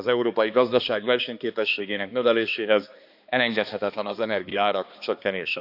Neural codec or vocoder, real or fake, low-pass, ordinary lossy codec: codec, 16 kHz, 2 kbps, X-Codec, HuBERT features, trained on balanced general audio; fake; 5.4 kHz; AAC, 48 kbps